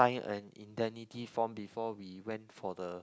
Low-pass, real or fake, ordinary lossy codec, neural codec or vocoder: none; real; none; none